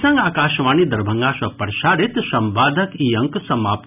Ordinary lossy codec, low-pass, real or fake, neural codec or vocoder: none; 3.6 kHz; real; none